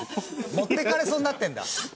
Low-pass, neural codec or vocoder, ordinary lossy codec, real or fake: none; none; none; real